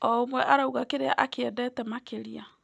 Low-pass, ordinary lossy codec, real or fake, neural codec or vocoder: none; none; real; none